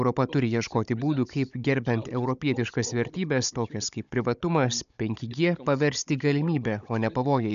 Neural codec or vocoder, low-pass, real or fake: codec, 16 kHz, 16 kbps, FunCodec, trained on Chinese and English, 50 frames a second; 7.2 kHz; fake